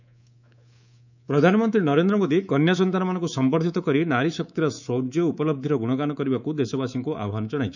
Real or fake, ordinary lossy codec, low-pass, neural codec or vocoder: fake; none; 7.2 kHz; codec, 24 kHz, 3.1 kbps, DualCodec